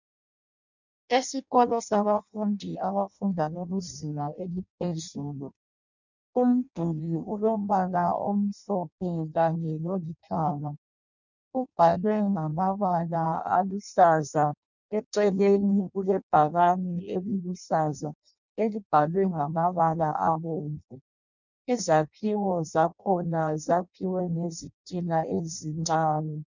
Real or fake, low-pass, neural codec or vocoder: fake; 7.2 kHz; codec, 16 kHz in and 24 kHz out, 0.6 kbps, FireRedTTS-2 codec